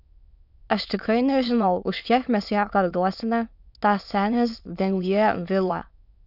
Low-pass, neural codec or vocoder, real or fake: 5.4 kHz; autoencoder, 22.05 kHz, a latent of 192 numbers a frame, VITS, trained on many speakers; fake